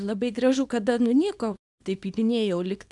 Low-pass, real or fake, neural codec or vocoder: 10.8 kHz; fake; codec, 24 kHz, 0.9 kbps, WavTokenizer, small release